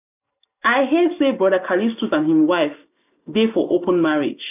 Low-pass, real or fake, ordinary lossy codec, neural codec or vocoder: 3.6 kHz; real; none; none